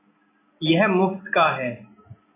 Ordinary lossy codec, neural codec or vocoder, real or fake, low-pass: AAC, 16 kbps; none; real; 3.6 kHz